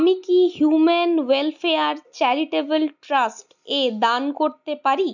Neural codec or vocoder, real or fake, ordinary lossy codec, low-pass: none; real; none; 7.2 kHz